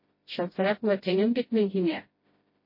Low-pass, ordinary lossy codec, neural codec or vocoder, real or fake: 5.4 kHz; MP3, 24 kbps; codec, 16 kHz, 0.5 kbps, FreqCodec, smaller model; fake